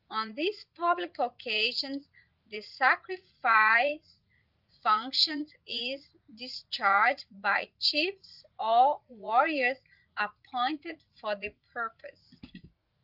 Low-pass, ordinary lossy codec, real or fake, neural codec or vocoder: 5.4 kHz; Opus, 32 kbps; fake; vocoder, 44.1 kHz, 80 mel bands, Vocos